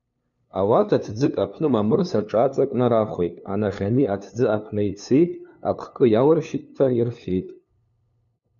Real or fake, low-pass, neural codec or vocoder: fake; 7.2 kHz; codec, 16 kHz, 2 kbps, FunCodec, trained on LibriTTS, 25 frames a second